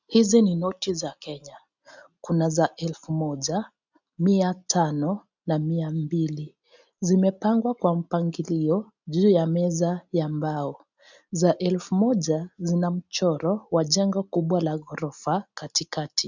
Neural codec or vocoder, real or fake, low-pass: none; real; 7.2 kHz